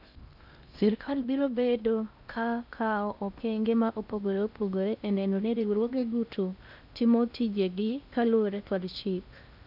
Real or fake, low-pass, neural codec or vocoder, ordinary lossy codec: fake; 5.4 kHz; codec, 16 kHz in and 24 kHz out, 0.6 kbps, FocalCodec, streaming, 4096 codes; none